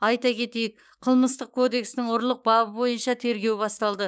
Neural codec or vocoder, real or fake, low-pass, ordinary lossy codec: codec, 16 kHz, 6 kbps, DAC; fake; none; none